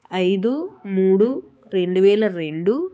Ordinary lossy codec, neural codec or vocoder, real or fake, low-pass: none; codec, 16 kHz, 4 kbps, X-Codec, HuBERT features, trained on balanced general audio; fake; none